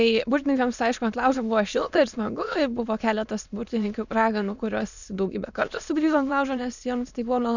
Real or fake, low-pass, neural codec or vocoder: fake; 7.2 kHz; autoencoder, 22.05 kHz, a latent of 192 numbers a frame, VITS, trained on many speakers